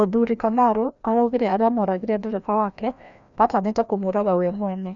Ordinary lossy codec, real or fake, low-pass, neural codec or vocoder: none; fake; 7.2 kHz; codec, 16 kHz, 1 kbps, FreqCodec, larger model